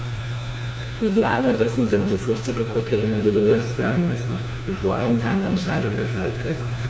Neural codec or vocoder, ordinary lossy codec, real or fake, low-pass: codec, 16 kHz, 1 kbps, FunCodec, trained on LibriTTS, 50 frames a second; none; fake; none